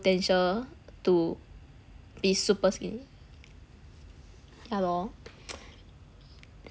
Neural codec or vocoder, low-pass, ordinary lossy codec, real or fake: none; none; none; real